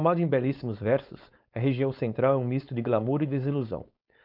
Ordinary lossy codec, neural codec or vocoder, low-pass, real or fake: none; codec, 16 kHz, 4.8 kbps, FACodec; 5.4 kHz; fake